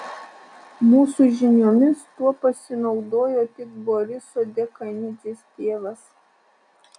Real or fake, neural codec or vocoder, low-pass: real; none; 10.8 kHz